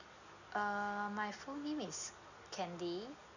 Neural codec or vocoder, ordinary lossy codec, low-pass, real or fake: none; none; 7.2 kHz; real